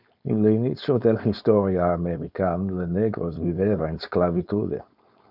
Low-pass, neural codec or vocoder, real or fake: 5.4 kHz; codec, 16 kHz, 4.8 kbps, FACodec; fake